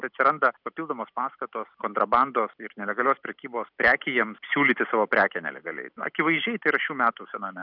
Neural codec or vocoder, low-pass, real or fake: none; 5.4 kHz; real